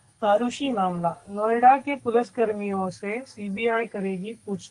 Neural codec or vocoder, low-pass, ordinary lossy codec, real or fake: codec, 44.1 kHz, 2.6 kbps, SNAC; 10.8 kHz; Opus, 32 kbps; fake